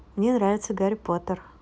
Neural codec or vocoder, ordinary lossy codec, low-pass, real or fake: none; none; none; real